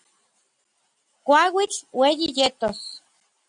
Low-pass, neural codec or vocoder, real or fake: 9.9 kHz; none; real